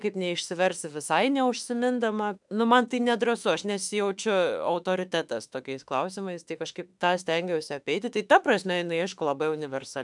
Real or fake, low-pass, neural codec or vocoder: fake; 10.8 kHz; autoencoder, 48 kHz, 32 numbers a frame, DAC-VAE, trained on Japanese speech